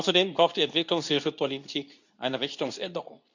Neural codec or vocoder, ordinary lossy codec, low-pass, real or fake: codec, 24 kHz, 0.9 kbps, WavTokenizer, medium speech release version 2; none; 7.2 kHz; fake